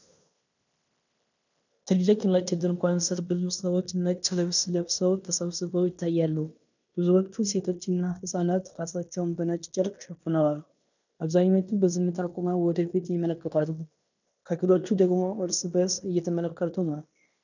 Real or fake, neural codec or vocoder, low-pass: fake; codec, 16 kHz in and 24 kHz out, 0.9 kbps, LongCat-Audio-Codec, fine tuned four codebook decoder; 7.2 kHz